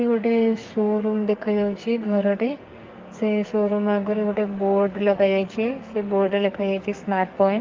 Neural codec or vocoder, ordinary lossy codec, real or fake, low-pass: codec, 44.1 kHz, 2.6 kbps, SNAC; Opus, 32 kbps; fake; 7.2 kHz